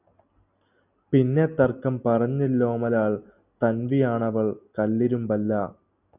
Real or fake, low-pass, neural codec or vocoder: real; 3.6 kHz; none